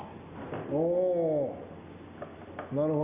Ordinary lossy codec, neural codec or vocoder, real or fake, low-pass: Opus, 64 kbps; none; real; 3.6 kHz